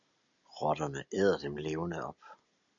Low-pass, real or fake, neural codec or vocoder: 7.2 kHz; real; none